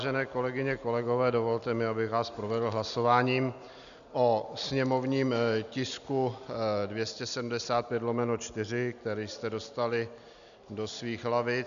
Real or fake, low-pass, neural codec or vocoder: real; 7.2 kHz; none